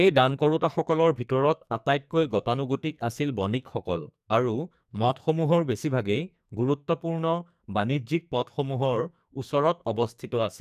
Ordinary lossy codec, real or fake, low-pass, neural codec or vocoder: none; fake; 14.4 kHz; codec, 44.1 kHz, 2.6 kbps, SNAC